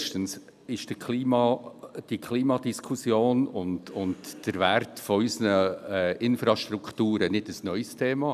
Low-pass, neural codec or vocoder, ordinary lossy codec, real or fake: 14.4 kHz; none; none; real